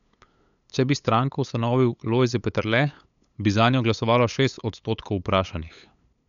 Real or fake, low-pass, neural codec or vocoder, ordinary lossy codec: fake; 7.2 kHz; codec, 16 kHz, 8 kbps, FunCodec, trained on LibriTTS, 25 frames a second; none